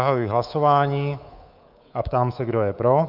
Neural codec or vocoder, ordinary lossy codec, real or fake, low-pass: codec, 24 kHz, 3.1 kbps, DualCodec; Opus, 24 kbps; fake; 5.4 kHz